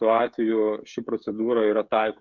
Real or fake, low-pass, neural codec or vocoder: fake; 7.2 kHz; codec, 16 kHz, 16 kbps, FreqCodec, smaller model